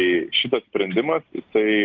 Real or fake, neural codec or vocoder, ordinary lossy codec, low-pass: real; none; Opus, 24 kbps; 7.2 kHz